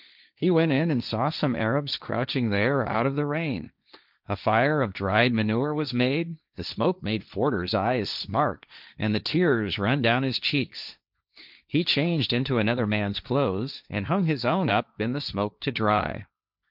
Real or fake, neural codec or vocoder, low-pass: fake; codec, 16 kHz, 1.1 kbps, Voila-Tokenizer; 5.4 kHz